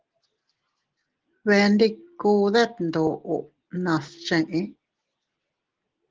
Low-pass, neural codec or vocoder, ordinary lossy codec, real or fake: 7.2 kHz; none; Opus, 16 kbps; real